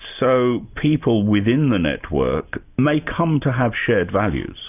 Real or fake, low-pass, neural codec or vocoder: real; 3.6 kHz; none